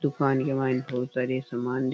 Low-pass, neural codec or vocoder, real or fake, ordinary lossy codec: none; none; real; none